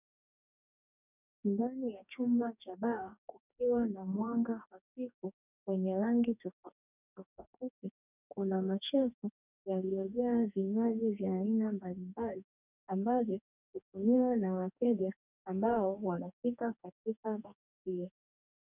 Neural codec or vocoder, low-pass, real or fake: codec, 44.1 kHz, 2.6 kbps, DAC; 3.6 kHz; fake